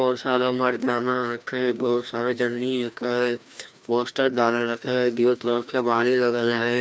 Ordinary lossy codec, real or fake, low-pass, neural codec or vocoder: none; fake; none; codec, 16 kHz, 1 kbps, FreqCodec, larger model